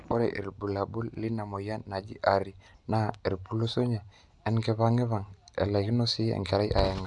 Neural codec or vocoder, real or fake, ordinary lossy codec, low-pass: none; real; none; 10.8 kHz